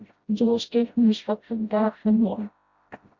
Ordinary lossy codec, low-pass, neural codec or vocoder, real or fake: Opus, 64 kbps; 7.2 kHz; codec, 16 kHz, 0.5 kbps, FreqCodec, smaller model; fake